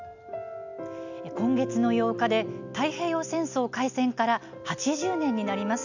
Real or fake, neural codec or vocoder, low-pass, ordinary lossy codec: real; none; 7.2 kHz; none